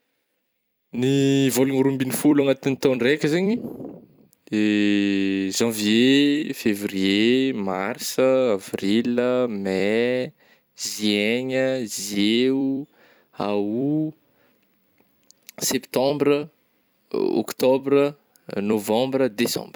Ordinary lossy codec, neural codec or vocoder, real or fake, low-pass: none; vocoder, 44.1 kHz, 128 mel bands every 256 samples, BigVGAN v2; fake; none